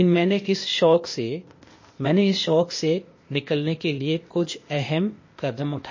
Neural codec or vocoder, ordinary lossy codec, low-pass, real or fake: codec, 16 kHz, 0.8 kbps, ZipCodec; MP3, 32 kbps; 7.2 kHz; fake